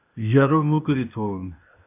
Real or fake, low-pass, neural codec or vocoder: fake; 3.6 kHz; codec, 16 kHz, 0.8 kbps, ZipCodec